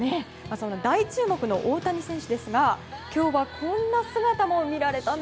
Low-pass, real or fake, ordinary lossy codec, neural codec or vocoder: none; real; none; none